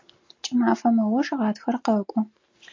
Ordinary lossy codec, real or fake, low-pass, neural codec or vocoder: MP3, 48 kbps; real; 7.2 kHz; none